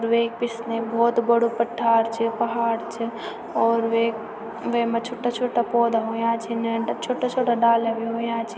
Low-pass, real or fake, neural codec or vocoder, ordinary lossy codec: none; real; none; none